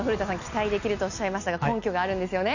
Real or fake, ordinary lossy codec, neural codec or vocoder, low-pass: real; none; none; 7.2 kHz